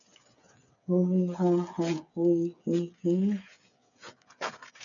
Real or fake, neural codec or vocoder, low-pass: fake; codec, 16 kHz, 8 kbps, FreqCodec, smaller model; 7.2 kHz